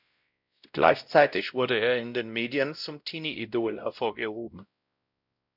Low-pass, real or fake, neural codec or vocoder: 5.4 kHz; fake; codec, 16 kHz, 0.5 kbps, X-Codec, WavLM features, trained on Multilingual LibriSpeech